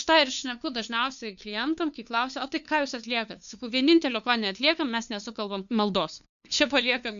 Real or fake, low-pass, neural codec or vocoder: fake; 7.2 kHz; codec, 16 kHz, 2 kbps, FunCodec, trained on LibriTTS, 25 frames a second